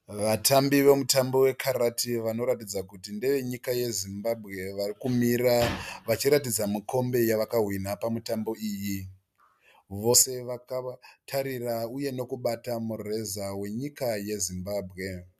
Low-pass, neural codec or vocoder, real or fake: 14.4 kHz; none; real